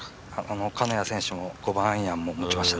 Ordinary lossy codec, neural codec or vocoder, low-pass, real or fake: none; none; none; real